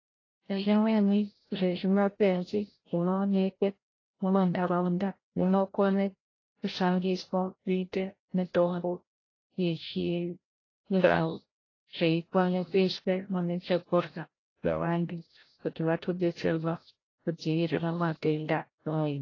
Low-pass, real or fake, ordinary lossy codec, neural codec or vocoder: 7.2 kHz; fake; AAC, 32 kbps; codec, 16 kHz, 0.5 kbps, FreqCodec, larger model